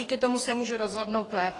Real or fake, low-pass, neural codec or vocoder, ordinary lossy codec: fake; 10.8 kHz; codec, 44.1 kHz, 2.6 kbps, DAC; AAC, 32 kbps